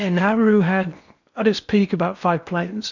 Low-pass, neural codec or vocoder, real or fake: 7.2 kHz; codec, 16 kHz in and 24 kHz out, 0.8 kbps, FocalCodec, streaming, 65536 codes; fake